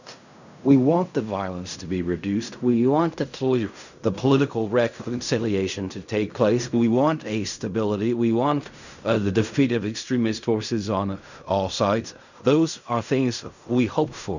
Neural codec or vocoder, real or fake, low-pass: codec, 16 kHz in and 24 kHz out, 0.4 kbps, LongCat-Audio-Codec, fine tuned four codebook decoder; fake; 7.2 kHz